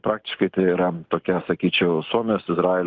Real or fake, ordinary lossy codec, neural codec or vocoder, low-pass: real; Opus, 32 kbps; none; 7.2 kHz